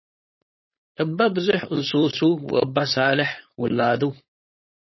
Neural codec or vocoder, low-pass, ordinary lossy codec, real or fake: codec, 16 kHz, 4.8 kbps, FACodec; 7.2 kHz; MP3, 24 kbps; fake